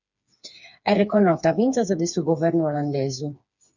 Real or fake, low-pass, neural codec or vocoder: fake; 7.2 kHz; codec, 16 kHz, 4 kbps, FreqCodec, smaller model